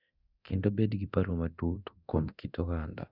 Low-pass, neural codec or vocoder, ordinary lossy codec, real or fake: 5.4 kHz; codec, 24 kHz, 0.9 kbps, DualCodec; none; fake